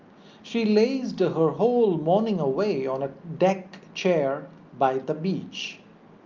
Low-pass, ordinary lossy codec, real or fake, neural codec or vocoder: 7.2 kHz; Opus, 32 kbps; real; none